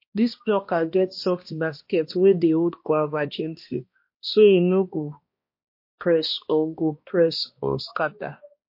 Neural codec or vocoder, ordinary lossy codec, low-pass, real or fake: codec, 16 kHz, 1 kbps, X-Codec, HuBERT features, trained on balanced general audio; MP3, 32 kbps; 5.4 kHz; fake